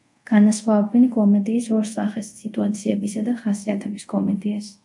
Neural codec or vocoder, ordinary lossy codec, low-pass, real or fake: codec, 24 kHz, 0.5 kbps, DualCodec; MP3, 64 kbps; 10.8 kHz; fake